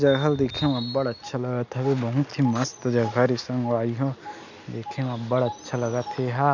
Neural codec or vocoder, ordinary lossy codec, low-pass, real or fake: none; none; 7.2 kHz; real